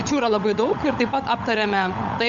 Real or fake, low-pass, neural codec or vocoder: fake; 7.2 kHz; codec, 16 kHz, 16 kbps, FunCodec, trained on LibriTTS, 50 frames a second